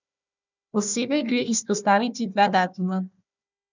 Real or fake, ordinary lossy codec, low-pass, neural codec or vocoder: fake; none; 7.2 kHz; codec, 16 kHz, 1 kbps, FunCodec, trained on Chinese and English, 50 frames a second